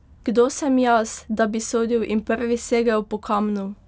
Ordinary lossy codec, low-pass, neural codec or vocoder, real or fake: none; none; none; real